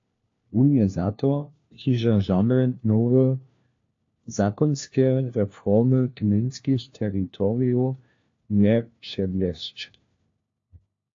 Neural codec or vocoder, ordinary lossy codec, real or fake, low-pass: codec, 16 kHz, 1 kbps, FunCodec, trained on LibriTTS, 50 frames a second; AAC, 48 kbps; fake; 7.2 kHz